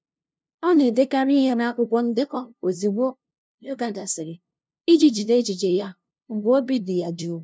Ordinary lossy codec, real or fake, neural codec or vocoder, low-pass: none; fake; codec, 16 kHz, 0.5 kbps, FunCodec, trained on LibriTTS, 25 frames a second; none